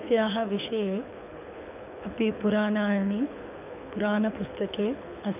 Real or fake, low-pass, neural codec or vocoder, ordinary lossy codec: fake; 3.6 kHz; codec, 24 kHz, 6 kbps, HILCodec; none